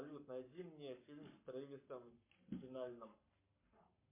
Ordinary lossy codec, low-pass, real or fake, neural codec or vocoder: MP3, 24 kbps; 3.6 kHz; fake; codec, 44.1 kHz, 7.8 kbps, Pupu-Codec